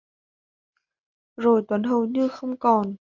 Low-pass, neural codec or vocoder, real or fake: 7.2 kHz; none; real